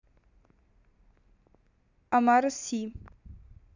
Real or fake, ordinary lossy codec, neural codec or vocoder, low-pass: real; none; none; 7.2 kHz